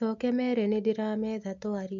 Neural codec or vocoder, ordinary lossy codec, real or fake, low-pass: none; MP3, 48 kbps; real; 7.2 kHz